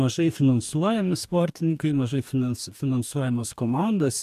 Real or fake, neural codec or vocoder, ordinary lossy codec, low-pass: fake; codec, 44.1 kHz, 2.6 kbps, DAC; AAC, 96 kbps; 14.4 kHz